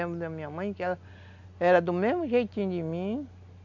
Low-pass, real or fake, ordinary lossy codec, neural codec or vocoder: 7.2 kHz; real; none; none